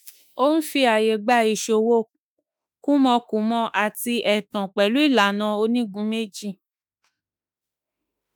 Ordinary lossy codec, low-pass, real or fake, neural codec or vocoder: none; none; fake; autoencoder, 48 kHz, 32 numbers a frame, DAC-VAE, trained on Japanese speech